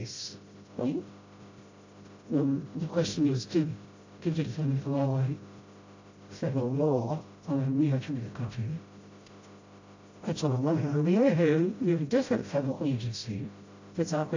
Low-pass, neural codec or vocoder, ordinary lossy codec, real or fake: 7.2 kHz; codec, 16 kHz, 0.5 kbps, FreqCodec, smaller model; AAC, 48 kbps; fake